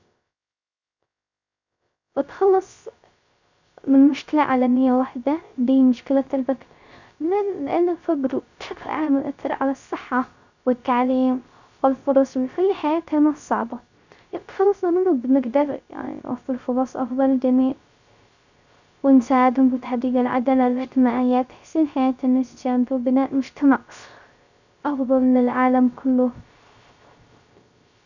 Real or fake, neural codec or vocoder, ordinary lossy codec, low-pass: fake; codec, 16 kHz, 0.3 kbps, FocalCodec; none; 7.2 kHz